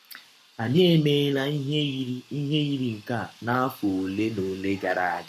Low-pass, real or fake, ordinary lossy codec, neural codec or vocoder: 14.4 kHz; fake; none; codec, 44.1 kHz, 7.8 kbps, Pupu-Codec